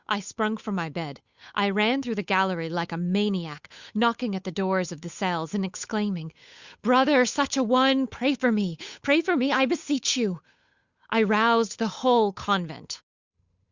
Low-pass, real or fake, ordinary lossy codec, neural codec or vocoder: 7.2 kHz; fake; Opus, 64 kbps; codec, 16 kHz, 8 kbps, FunCodec, trained on Chinese and English, 25 frames a second